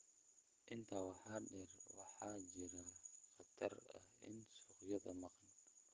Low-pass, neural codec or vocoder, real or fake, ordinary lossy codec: 7.2 kHz; none; real; Opus, 16 kbps